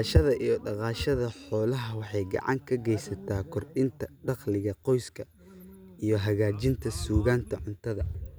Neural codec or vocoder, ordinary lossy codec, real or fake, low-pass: none; none; real; none